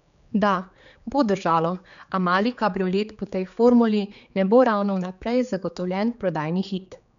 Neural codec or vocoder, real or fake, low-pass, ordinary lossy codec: codec, 16 kHz, 4 kbps, X-Codec, HuBERT features, trained on general audio; fake; 7.2 kHz; none